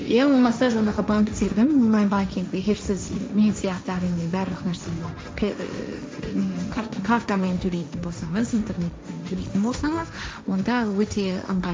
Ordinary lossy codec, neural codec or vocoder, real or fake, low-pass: none; codec, 16 kHz, 1.1 kbps, Voila-Tokenizer; fake; none